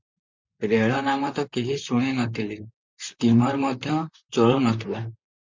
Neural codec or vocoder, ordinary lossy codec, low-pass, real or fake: vocoder, 44.1 kHz, 128 mel bands, Pupu-Vocoder; MP3, 64 kbps; 7.2 kHz; fake